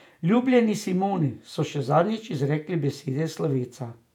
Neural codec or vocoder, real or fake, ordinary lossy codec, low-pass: vocoder, 48 kHz, 128 mel bands, Vocos; fake; none; 19.8 kHz